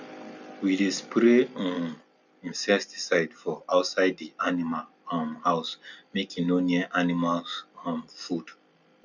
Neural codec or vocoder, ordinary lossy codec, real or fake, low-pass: none; none; real; 7.2 kHz